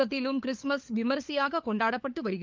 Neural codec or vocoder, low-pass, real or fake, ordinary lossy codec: codec, 16 kHz, 4 kbps, FunCodec, trained on LibriTTS, 50 frames a second; 7.2 kHz; fake; Opus, 32 kbps